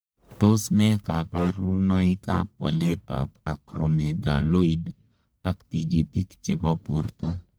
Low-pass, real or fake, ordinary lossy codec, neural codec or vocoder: none; fake; none; codec, 44.1 kHz, 1.7 kbps, Pupu-Codec